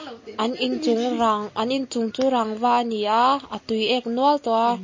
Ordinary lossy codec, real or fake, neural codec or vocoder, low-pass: MP3, 32 kbps; real; none; 7.2 kHz